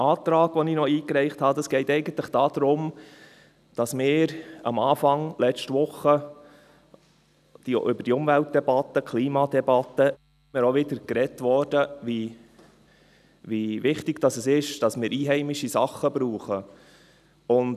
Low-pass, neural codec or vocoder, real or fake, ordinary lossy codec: 14.4 kHz; none; real; none